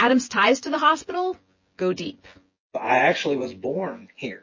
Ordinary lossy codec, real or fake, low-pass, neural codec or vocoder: MP3, 32 kbps; fake; 7.2 kHz; vocoder, 24 kHz, 100 mel bands, Vocos